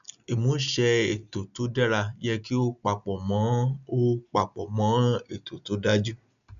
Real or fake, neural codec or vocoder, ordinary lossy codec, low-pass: real; none; none; 7.2 kHz